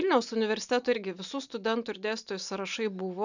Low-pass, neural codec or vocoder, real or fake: 7.2 kHz; none; real